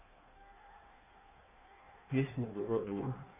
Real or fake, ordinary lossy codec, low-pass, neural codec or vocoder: fake; none; 3.6 kHz; codec, 16 kHz in and 24 kHz out, 1.1 kbps, FireRedTTS-2 codec